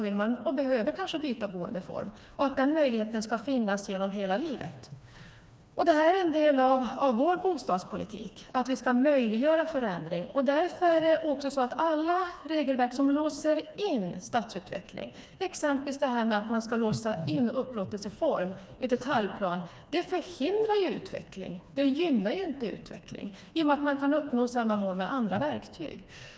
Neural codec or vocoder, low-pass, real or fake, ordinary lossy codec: codec, 16 kHz, 2 kbps, FreqCodec, smaller model; none; fake; none